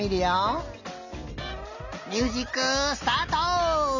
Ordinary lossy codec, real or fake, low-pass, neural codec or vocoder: none; real; 7.2 kHz; none